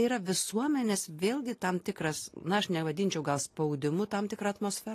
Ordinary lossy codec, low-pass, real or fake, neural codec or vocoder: AAC, 48 kbps; 14.4 kHz; real; none